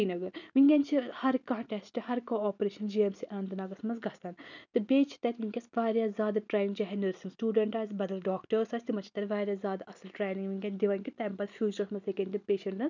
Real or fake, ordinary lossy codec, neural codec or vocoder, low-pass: real; none; none; 7.2 kHz